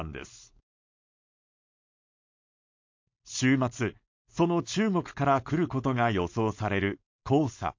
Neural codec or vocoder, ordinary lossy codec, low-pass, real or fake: codec, 16 kHz, 4.8 kbps, FACodec; MP3, 48 kbps; 7.2 kHz; fake